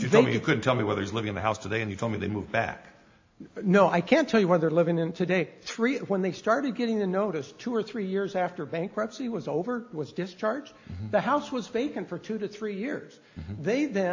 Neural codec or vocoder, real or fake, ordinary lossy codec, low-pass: none; real; AAC, 48 kbps; 7.2 kHz